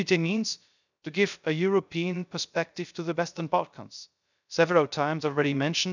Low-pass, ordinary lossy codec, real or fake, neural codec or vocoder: 7.2 kHz; none; fake; codec, 16 kHz, 0.3 kbps, FocalCodec